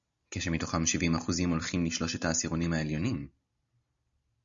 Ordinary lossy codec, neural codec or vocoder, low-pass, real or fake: Opus, 64 kbps; none; 7.2 kHz; real